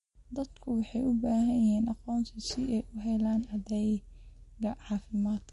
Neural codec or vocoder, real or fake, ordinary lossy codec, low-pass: none; real; MP3, 48 kbps; 14.4 kHz